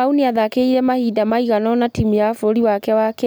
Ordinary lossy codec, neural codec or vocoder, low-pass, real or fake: none; none; none; real